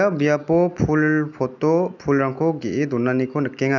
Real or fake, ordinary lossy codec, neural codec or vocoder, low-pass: real; none; none; 7.2 kHz